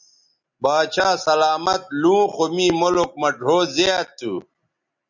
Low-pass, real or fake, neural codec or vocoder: 7.2 kHz; real; none